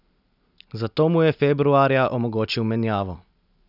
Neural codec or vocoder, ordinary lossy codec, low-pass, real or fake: none; none; 5.4 kHz; real